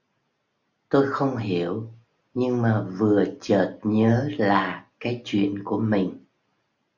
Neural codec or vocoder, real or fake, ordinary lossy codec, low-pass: none; real; Opus, 64 kbps; 7.2 kHz